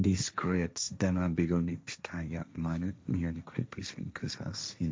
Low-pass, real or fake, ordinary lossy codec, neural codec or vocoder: none; fake; none; codec, 16 kHz, 1.1 kbps, Voila-Tokenizer